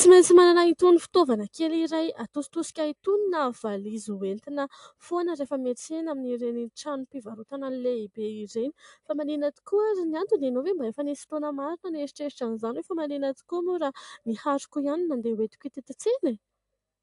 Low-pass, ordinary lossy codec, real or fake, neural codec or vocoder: 10.8 kHz; AAC, 64 kbps; real; none